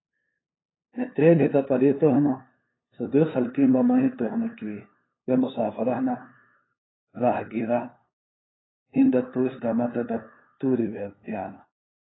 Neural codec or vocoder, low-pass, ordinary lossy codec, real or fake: codec, 16 kHz, 2 kbps, FunCodec, trained on LibriTTS, 25 frames a second; 7.2 kHz; AAC, 16 kbps; fake